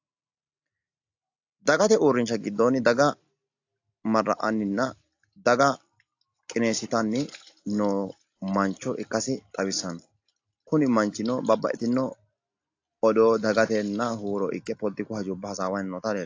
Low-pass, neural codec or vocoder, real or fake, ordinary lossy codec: 7.2 kHz; none; real; AAC, 48 kbps